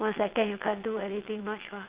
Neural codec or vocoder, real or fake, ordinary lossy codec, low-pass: vocoder, 22.05 kHz, 80 mel bands, WaveNeXt; fake; Opus, 32 kbps; 3.6 kHz